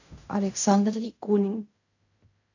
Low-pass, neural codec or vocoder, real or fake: 7.2 kHz; codec, 16 kHz in and 24 kHz out, 0.4 kbps, LongCat-Audio-Codec, fine tuned four codebook decoder; fake